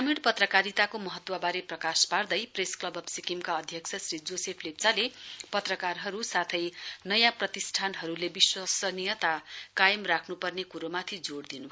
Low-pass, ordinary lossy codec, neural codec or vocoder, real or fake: none; none; none; real